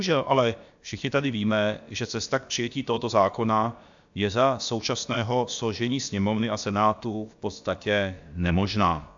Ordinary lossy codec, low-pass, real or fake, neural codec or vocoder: AAC, 64 kbps; 7.2 kHz; fake; codec, 16 kHz, about 1 kbps, DyCAST, with the encoder's durations